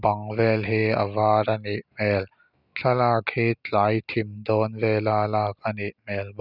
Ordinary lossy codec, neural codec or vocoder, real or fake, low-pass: none; none; real; 5.4 kHz